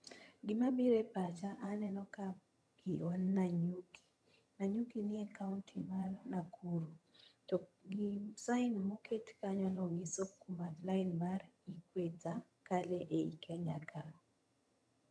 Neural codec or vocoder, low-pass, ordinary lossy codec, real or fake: vocoder, 22.05 kHz, 80 mel bands, HiFi-GAN; none; none; fake